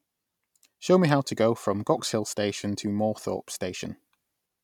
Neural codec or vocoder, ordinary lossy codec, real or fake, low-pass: none; none; real; 19.8 kHz